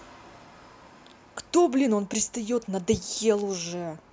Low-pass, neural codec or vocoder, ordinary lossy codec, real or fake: none; none; none; real